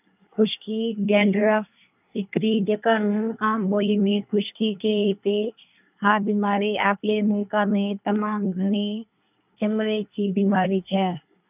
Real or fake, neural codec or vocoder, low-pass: fake; codec, 24 kHz, 1 kbps, SNAC; 3.6 kHz